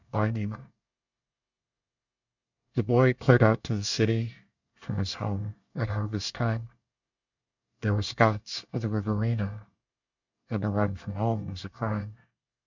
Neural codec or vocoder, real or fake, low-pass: codec, 24 kHz, 1 kbps, SNAC; fake; 7.2 kHz